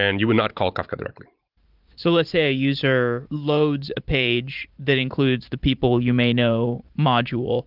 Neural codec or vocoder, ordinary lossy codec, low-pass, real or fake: vocoder, 44.1 kHz, 128 mel bands every 512 samples, BigVGAN v2; Opus, 32 kbps; 5.4 kHz; fake